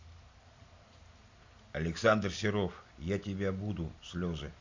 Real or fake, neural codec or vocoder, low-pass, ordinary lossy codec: real; none; 7.2 kHz; MP3, 48 kbps